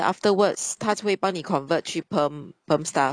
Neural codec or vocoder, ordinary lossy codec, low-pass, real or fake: none; none; 9.9 kHz; real